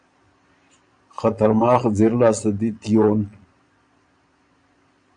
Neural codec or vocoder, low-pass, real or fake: vocoder, 22.05 kHz, 80 mel bands, Vocos; 9.9 kHz; fake